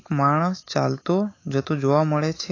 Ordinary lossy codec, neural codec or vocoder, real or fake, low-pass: AAC, 32 kbps; autoencoder, 48 kHz, 128 numbers a frame, DAC-VAE, trained on Japanese speech; fake; 7.2 kHz